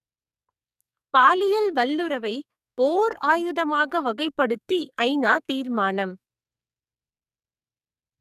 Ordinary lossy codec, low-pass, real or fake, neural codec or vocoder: none; 14.4 kHz; fake; codec, 44.1 kHz, 2.6 kbps, SNAC